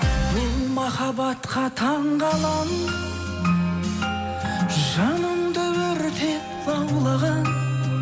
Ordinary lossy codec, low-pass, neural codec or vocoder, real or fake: none; none; none; real